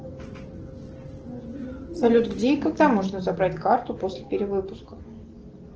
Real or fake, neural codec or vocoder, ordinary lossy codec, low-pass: real; none; Opus, 16 kbps; 7.2 kHz